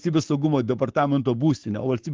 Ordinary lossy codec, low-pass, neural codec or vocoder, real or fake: Opus, 16 kbps; 7.2 kHz; codec, 44.1 kHz, 7.8 kbps, Pupu-Codec; fake